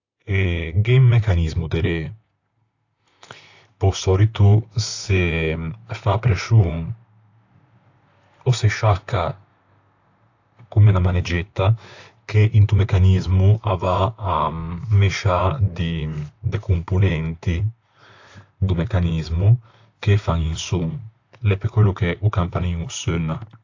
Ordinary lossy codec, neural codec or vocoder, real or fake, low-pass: AAC, 48 kbps; vocoder, 44.1 kHz, 128 mel bands, Pupu-Vocoder; fake; 7.2 kHz